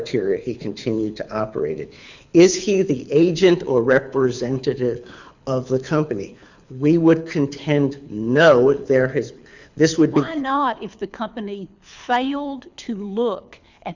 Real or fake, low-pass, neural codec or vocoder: fake; 7.2 kHz; codec, 24 kHz, 6 kbps, HILCodec